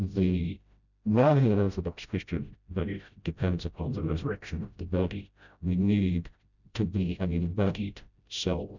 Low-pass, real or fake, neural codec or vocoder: 7.2 kHz; fake; codec, 16 kHz, 0.5 kbps, FreqCodec, smaller model